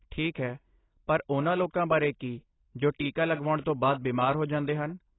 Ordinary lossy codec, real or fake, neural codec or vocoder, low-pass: AAC, 16 kbps; real; none; 7.2 kHz